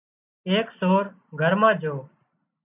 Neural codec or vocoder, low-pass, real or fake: none; 3.6 kHz; real